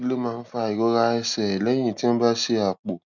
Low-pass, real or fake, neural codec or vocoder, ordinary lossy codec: 7.2 kHz; real; none; none